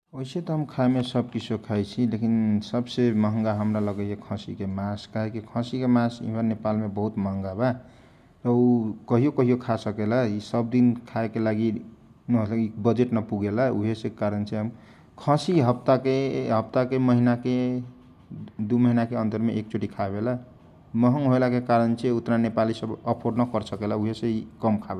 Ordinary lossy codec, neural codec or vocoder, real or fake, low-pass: none; none; real; 14.4 kHz